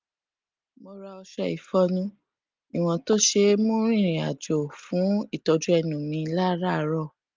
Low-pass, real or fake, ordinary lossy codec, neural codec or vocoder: 7.2 kHz; real; Opus, 24 kbps; none